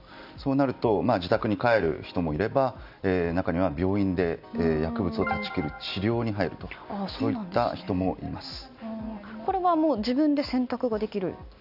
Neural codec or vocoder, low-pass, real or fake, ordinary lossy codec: none; 5.4 kHz; real; none